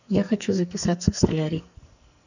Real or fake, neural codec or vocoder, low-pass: fake; codec, 44.1 kHz, 2.6 kbps, SNAC; 7.2 kHz